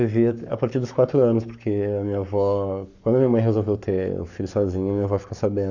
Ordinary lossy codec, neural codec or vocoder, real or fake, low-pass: none; codec, 44.1 kHz, 7.8 kbps, Pupu-Codec; fake; 7.2 kHz